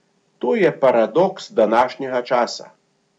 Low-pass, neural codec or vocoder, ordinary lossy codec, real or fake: 9.9 kHz; none; none; real